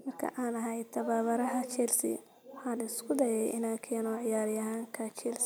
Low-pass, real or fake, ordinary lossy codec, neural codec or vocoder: none; real; none; none